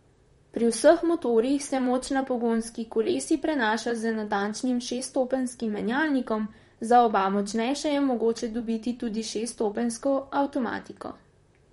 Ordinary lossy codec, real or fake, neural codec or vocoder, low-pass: MP3, 48 kbps; fake; vocoder, 44.1 kHz, 128 mel bands, Pupu-Vocoder; 19.8 kHz